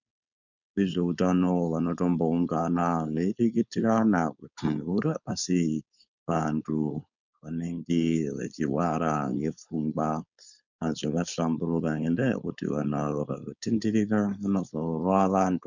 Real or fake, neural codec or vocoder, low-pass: fake; codec, 16 kHz, 4.8 kbps, FACodec; 7.2 kHz